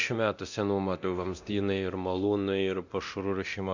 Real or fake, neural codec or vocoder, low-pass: fake; codec, 24 kHz, 0.9 kbps, DualCodec; 7.2 kHz